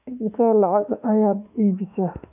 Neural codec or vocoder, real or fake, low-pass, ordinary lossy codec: autoencoder, 48 kHz, 32 numbers a frame, DAC-VAE, trained on Japanese speech; fake; 3.6 kHz; none